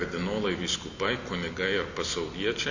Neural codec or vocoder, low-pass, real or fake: none; 7.2 kHz; real